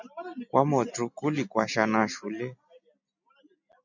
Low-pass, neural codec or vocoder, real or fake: 7.2 kHz; none; real